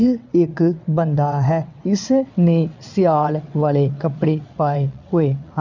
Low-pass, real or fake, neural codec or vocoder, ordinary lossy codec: 7.2 kHz; fake; vocoder, 22.05 kHz, 80 mel bands, WaveNeXt; none